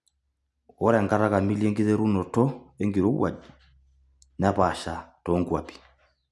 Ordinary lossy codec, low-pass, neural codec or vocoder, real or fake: none; none; none; real